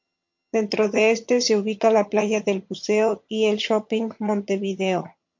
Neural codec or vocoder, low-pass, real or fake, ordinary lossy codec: vocoder, 22.05 kHz, 80 mel bands, HiFi-GAN; 7.2 kHz; fake; MP3, 48 kbps